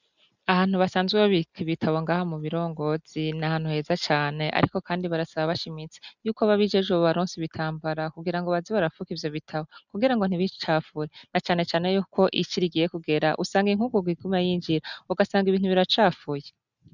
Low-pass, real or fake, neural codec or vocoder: 7.2 kHz; real; none